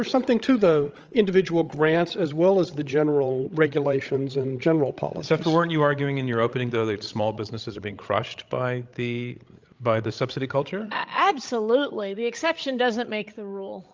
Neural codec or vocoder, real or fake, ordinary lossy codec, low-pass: codec, 16 kHz, 16 kbps, FreqCodec, larger model; fake; Opus, 24 kbps; 7.2 kHz